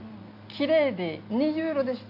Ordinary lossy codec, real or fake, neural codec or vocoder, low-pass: none; real; none; 5.4 kHz